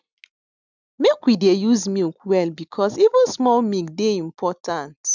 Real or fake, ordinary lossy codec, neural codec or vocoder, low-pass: real; none; none; 7.2 kHz